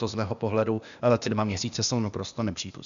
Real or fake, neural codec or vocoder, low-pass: fake; codec, 16 kHz, 0.8 kbps, ZipCodec; 7.2 kHz